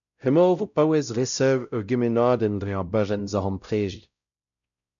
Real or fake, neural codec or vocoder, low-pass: fake; codec, 16 kHz, 0.5 kbps, X-Codec, WavLM features, trained on Multilingual LibriSpeech; 7.2 kHz